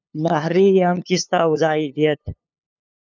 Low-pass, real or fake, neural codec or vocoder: 7.2 kHz; fake; codec, 16 kHz, 2 kbps, FunCodec, trained on LibriTTS, 25 frames a second